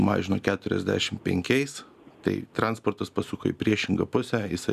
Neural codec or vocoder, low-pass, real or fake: none; 14.4 kHz; real